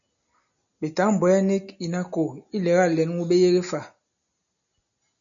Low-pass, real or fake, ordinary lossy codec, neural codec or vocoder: 7.2 kHz; real; MP3, 96 kbps; none